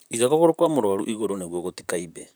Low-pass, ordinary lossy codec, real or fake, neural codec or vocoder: none; none; fake; vocoder, 44.1 kHz, 128 mel bands, Pupu-Vocoder